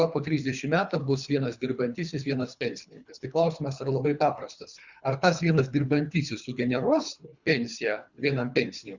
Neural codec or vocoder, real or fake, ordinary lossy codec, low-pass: codec, 24 kHz, 3 kbps, HILCodec; fake; Opus, 64 kbps; 7.2 kHz